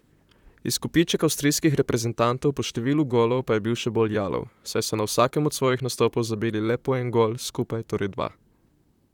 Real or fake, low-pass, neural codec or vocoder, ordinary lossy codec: fake; 19.8 kHz; vocoder, 44.1 kHz, 128 mel bands, Pupu-Vocoder; none